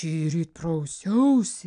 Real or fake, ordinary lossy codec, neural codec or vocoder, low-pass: real; AAC, 96 kbps; none; 9.9 kHz